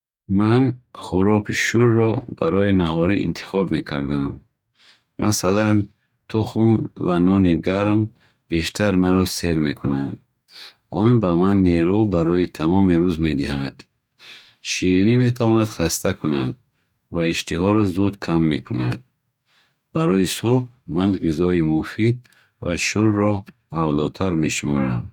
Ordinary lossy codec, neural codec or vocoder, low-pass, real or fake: none; codec, 44.1 kHz, 2.6 kbps, DAC; 19.8 kHz; fake